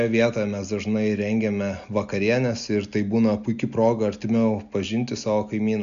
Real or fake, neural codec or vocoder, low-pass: real; none; 7.2 kHz